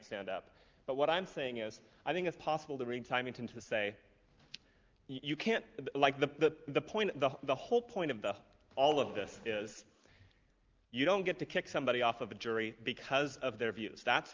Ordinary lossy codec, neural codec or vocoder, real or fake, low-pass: Opus, 32 kbps; none; real; 7.2 kHz